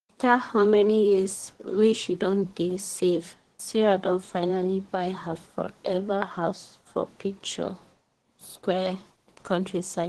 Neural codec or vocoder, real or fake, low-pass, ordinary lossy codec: codec, 24 kHz, 1 kbps, SNAC; fake; 10.8 kHz; Opus, 16 kbps